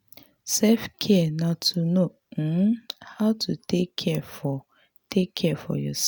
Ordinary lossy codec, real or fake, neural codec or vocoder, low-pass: none; real; none; none